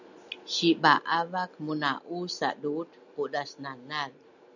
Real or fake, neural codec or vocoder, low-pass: real; none; 7.2 kHz